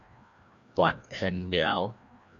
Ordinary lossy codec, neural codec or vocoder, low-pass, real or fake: MP3, 64 kbps; codec, 16 kHz, 1 kbps, FreqCodec, larger model; 7.2 kHz; fake